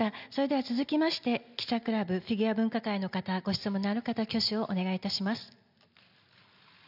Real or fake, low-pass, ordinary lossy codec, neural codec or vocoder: real; 5.4 kHz; none; none